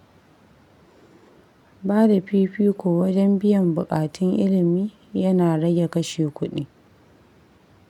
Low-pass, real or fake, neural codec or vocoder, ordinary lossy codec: 19.8 kHz; real; none; none